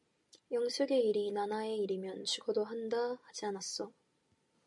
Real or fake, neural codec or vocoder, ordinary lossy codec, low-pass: real; none; MP3, 64 kbps; 10.8 kHz